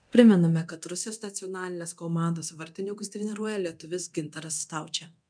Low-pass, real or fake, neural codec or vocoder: 9.9 kHz; fake; codec, 24 kHz, 0.9 kbps, DualCodec